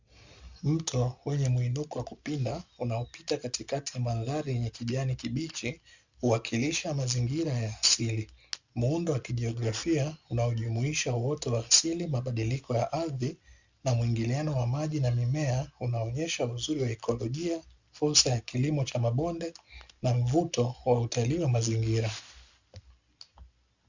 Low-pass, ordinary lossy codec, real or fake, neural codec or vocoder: 7.2 kHz; Opus, 64 kbps; real; none